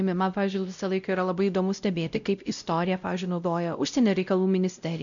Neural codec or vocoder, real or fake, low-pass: codec, 16 kHz, 0.5 kbps, X-Codec, WavLM features, trained on Multilingual LibriSpeech; fake; 7.2 kHz